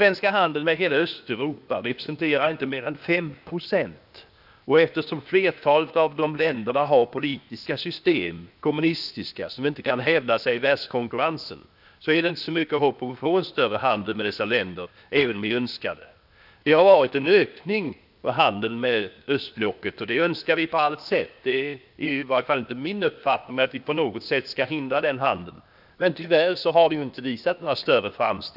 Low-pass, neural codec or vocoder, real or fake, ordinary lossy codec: 5.4 kHz; codec, 16 kHz, 0.8 kbps, ZipCodec; fake; none